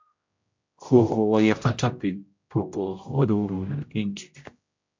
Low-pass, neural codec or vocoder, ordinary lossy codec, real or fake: 7.2 kHz; codec, 16 kHz, 0.5 kbps, X-Codec, HuBERT features, trained on general audio; MP3, 48 kbps; fake